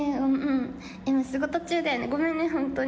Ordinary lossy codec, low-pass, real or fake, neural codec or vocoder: none; none; real; none